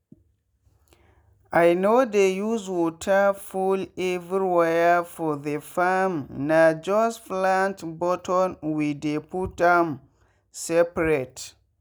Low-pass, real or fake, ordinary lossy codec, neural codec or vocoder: none; real; none; none